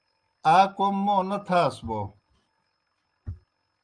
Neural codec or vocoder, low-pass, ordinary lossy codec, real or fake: none; 9.9 kHz; Opus, 32 kbps; real